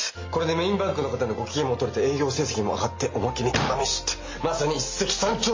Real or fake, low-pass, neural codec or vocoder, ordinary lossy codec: real; 7.2 kHz; none; MP3, 32 kbps